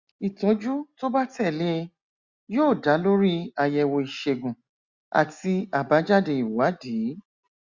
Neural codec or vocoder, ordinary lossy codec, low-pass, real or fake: none; Opus, 64 kbps; 7.2 kHz; real